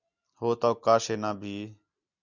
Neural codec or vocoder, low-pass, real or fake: none; 7.2 kHz; real